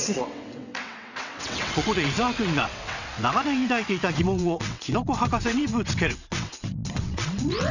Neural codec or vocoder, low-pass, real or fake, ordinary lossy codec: vocoder, 22.05 kHz, 80 mel bands, WaveNeXt; 7.2 kHz; fake; none